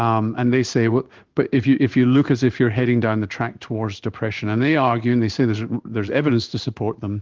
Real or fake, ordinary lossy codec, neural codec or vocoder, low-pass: fake; Opus, 32 kbps; codec, 16 kHz in and 24 kHz out, 1 kbps, XY-Tokenizer; 7.2 kHz